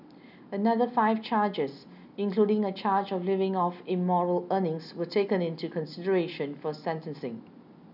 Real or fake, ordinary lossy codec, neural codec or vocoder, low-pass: real; none; none; 5.4 kHz